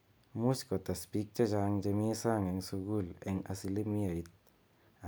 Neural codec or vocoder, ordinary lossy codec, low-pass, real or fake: none; none; none; real